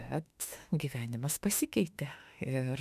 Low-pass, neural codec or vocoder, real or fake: 14.4 kHz; autoencoder, 48 kHz, 32 numbers a frame, DAC-VAE, trained on Japanese speech; fake